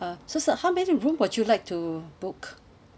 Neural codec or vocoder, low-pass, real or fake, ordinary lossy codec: none; none; real; none